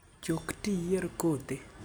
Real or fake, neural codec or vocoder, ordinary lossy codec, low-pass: real; none; none; none